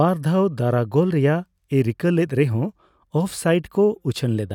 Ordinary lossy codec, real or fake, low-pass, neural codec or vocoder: none; real; 19.8 kHz; none